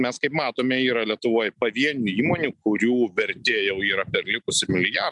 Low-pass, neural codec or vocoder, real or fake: 10.8 kHz; none; real